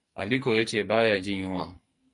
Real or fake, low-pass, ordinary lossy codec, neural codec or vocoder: fake; 10.8 kHz; MP3, 48 kbps; codec, 44.1 kHz, 2.6 kbps, SNAC